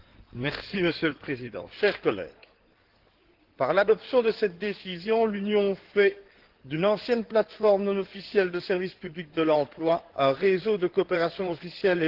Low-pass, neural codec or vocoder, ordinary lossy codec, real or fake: 5.4 kHz; codec, 16 kHz in and 24 kHz out, 2.2 kbps, FireRedTTS-2 codec; Opus, 16 kbps; fake